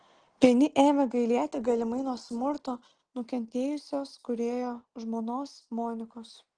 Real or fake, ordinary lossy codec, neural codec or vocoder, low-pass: real; Opus, 16 kbps; none; 9.9 kHz